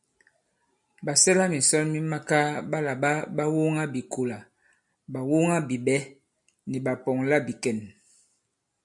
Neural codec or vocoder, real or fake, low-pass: none; real; 10.8 kHz